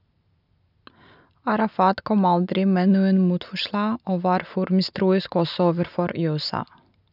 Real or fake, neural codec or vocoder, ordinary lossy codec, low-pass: real; none; none; 5.4 kHz